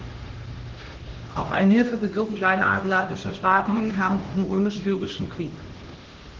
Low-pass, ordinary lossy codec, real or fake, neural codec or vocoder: 7.2 kHz; Opus, 16 kbps; fake; codec, 16 kHz, 1 kbps, X-Codec, HuBERT features, trained on LibriSpeech